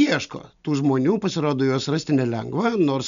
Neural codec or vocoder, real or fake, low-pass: none; real; 7.2 kHz